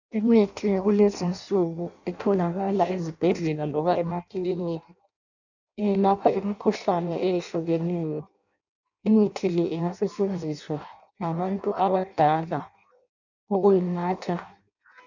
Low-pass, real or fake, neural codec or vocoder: 7.2 kHz; fake; codec, 16 kHz in and 24 kHz out, 0.6 kbps, FireRedTTS-2 codec